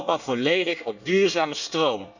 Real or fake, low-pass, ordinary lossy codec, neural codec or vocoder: fake; 7.2 kHz; none; codec, 24 kHz, 1 kbps, SNAC